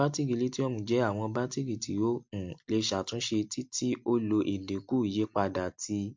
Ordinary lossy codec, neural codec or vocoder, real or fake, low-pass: MP3, 48 kbps; none; real; 7.2 kHz